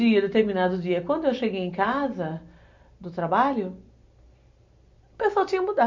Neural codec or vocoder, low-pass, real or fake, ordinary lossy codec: none; 7.2 kHz; real; MP3, 64 kbps